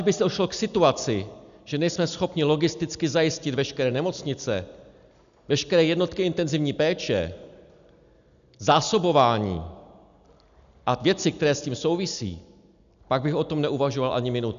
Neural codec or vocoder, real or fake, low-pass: none; real; 7.2 kHz